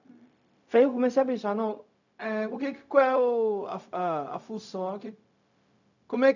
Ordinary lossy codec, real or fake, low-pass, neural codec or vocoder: none; fake; 7.2 kHz; codec, 16 kHz, 0.4 kbps, LongCat-Audio-Codec